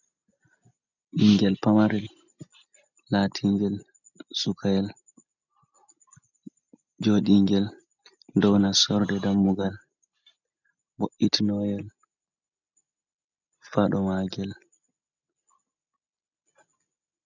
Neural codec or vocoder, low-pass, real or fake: none; 7.2 kHz; real